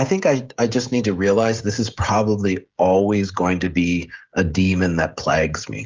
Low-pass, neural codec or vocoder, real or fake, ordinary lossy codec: 7.2 kHz; codec, 16 kHz, 16 kbps, FreqCodec, smaller model; fake; Opus, 24 kbps